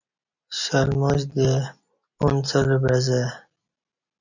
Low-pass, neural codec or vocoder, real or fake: 7.2 kHz; none; real